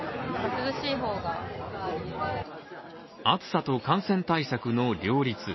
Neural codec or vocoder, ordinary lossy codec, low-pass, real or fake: none; MP3, 24 kbps; 7.2 kHz; real